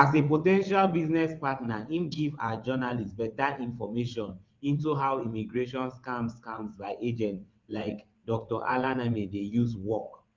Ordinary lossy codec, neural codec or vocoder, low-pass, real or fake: Opus, 24 kbps; vocoder, 22.05 kHz, 80 mel bands, WaveNeXt; 7.2 kHz; fake